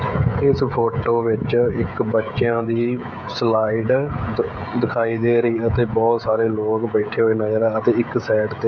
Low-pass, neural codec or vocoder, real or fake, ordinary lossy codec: 7.2 kHz; codec, 16 kHz, 16 kbps, FreqCodec, smaller model; fake; none